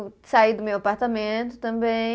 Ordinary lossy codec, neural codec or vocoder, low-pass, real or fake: none; none; none; real